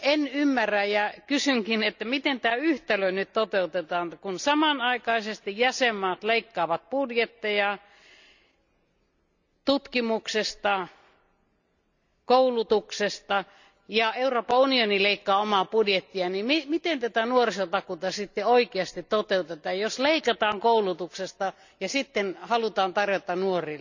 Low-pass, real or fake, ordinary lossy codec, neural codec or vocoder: 7.2 kHz; real; none; none